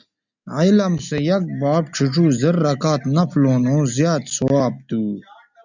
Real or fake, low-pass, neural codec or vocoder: real; 7.2 kHz; none